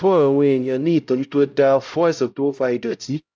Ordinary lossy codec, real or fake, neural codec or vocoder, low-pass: none; fake; codec, 16 kHz, 0.5 kbps, X-Codec, HuBERT features, trained on LibriSpeech; none